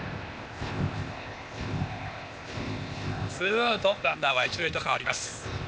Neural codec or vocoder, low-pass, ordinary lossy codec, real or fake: codec, 16 kHz, 0.8 kbps, ZipCodec; none; none; fake